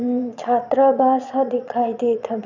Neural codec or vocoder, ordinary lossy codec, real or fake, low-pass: vocoder, 22.05 kHz, 80 mel bands, Vocos; none; fake; 7.2 kHz